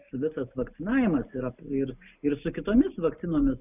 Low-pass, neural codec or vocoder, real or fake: 3.6 kHz; none; real